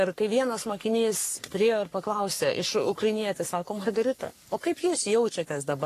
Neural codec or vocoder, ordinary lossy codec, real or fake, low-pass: codec, 44.1 kHz, 3.4 kbps, Pupu-Codec; AAC, 48 kbps; fake; 14.4 kHz